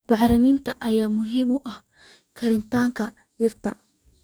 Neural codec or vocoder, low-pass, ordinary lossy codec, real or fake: codec, 44.1 kHz, 2.6 kbps, DAC; none; none; fake